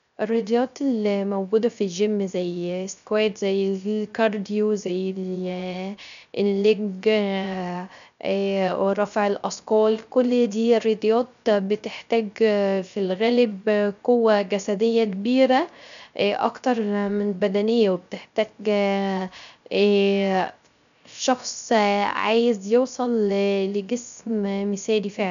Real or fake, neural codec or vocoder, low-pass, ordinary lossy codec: fake; codec, 16 kHz, 0.3 kbps, FocalCodec; 7.2 kHz; none